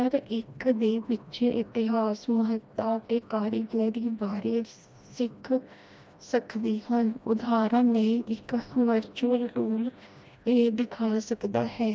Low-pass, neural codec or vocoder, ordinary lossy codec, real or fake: none; codec, 16 kHz, 1 kbps, FreqCodec, smaller model; none; fake